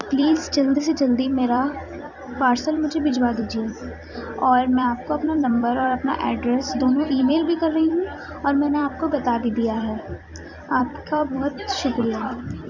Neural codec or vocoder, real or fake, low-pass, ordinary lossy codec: vocoder, 44.1 kHz, 128 mel bands every 256 samples, BigVGAN v2; fake; 7.2 kHz; none